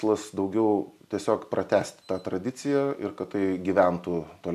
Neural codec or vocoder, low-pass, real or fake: none; 14.4 kHz; real